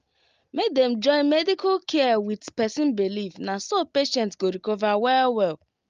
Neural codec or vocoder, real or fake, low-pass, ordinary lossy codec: none; real; 7.2 kHz; Opus, 32 kbps